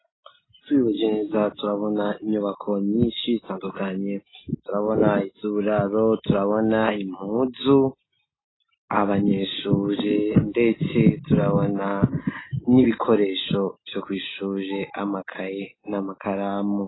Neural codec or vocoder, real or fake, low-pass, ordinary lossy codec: none; real; 7.2 kHz; AAC, 16 kbps